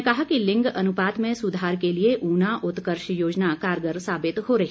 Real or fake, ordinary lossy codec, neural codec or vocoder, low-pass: real; none; none; none